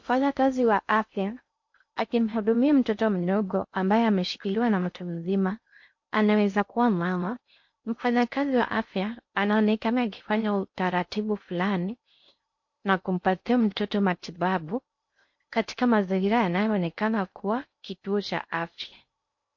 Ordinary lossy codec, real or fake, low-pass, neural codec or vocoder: MP3, 48 kbps; fake; 7.2 kHz; codec, 16 kHz in and 24 kHz out, 0.6 kbps, FocalCodec, streaming, 2048 codes